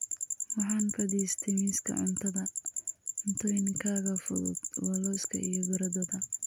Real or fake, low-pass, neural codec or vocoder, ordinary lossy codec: real; none; none; none